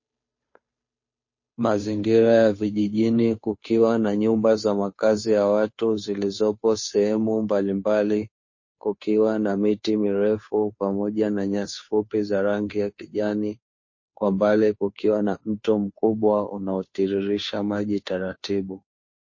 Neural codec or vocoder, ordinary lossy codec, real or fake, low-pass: codec, 16 kHz, 2 kbps, FunCodec, trained on Chinese and English, 25 frames a second; MP3, 32 kbps; fake; 7.2 kHz